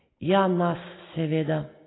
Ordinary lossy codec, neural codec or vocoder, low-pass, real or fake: AAC, 16 kbps; none; 7.2 kHz; real